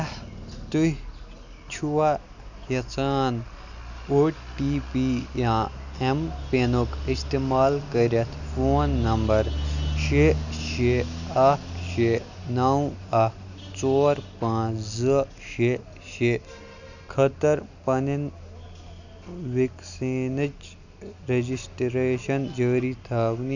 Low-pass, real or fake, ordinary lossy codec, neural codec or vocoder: 7.2 kHz; real; none; none